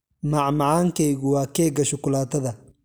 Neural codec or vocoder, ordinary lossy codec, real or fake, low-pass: none; none; real; none